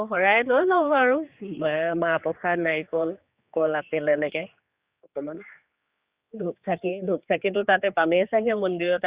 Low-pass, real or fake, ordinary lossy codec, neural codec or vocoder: 3.6 kHz; fake; Opus, 64 kbps; codec, 16 kHz, 4 kbps, X-Codec, HuBERT features, trained on general audio